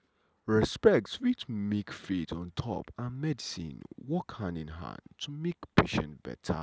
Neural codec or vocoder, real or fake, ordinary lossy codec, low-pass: none; real; none; none